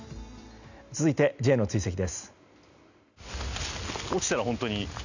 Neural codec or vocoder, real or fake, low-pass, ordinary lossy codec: none; real; 7.2 kHz; none